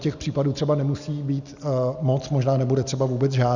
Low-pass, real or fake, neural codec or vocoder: 7.2 kHz; real; none